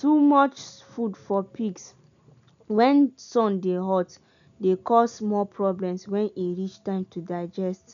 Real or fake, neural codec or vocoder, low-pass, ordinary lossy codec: real; none; 7.2 kHz; none